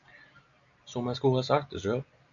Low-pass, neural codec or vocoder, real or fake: 7.2 kHz; none; real